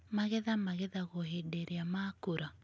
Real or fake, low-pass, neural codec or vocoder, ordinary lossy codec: real; none; none; none